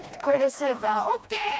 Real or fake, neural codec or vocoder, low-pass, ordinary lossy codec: fake; codec, 16 kHz, 1 kbps, FreqCodec, smaller model; none; none